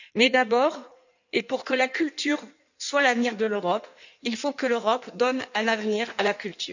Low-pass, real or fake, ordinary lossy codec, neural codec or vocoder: 7.2 kHz; fake; none; codec, 16 kHz in and 24 kHz out, 1.1 kbps, FireRedTTS-2 codec